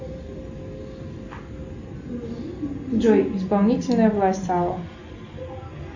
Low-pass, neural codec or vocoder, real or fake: 7.2 kHz; none; real